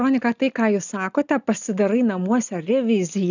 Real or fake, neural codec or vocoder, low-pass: real; none; 7.2 kHz